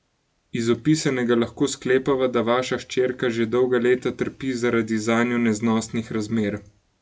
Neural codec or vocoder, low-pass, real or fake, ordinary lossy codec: none; none; real; none